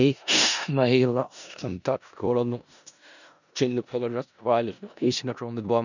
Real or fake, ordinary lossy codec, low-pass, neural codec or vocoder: fake; none; 7.2 kHz; codec, 16 kHz in and 24 kHz out, 0.4 kbps, LongCat-Audio-Codec, four codebook decoder